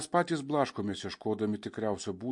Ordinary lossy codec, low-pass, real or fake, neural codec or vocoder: MP3, 48 kbps; 10.8 kHz; real; none